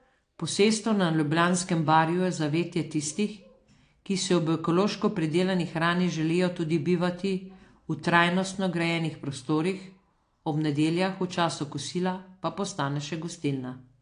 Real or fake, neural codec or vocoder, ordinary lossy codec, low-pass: real; none; AAC, 48 kbps; 9.9 kHz